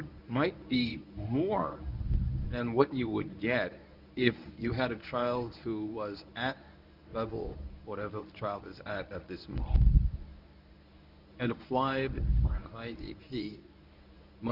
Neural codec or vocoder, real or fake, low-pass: codec, 24 kHz, 0.9 kbps, WavTokenizer, medium speech release version 1; fake; 5.4 kHz